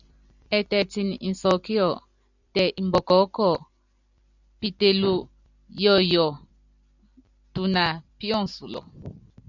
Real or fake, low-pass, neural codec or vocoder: fake; 7.2 kHz; vocoder, 44.1 kHz, 128 mel bands every 256 samples, BigVGAN v2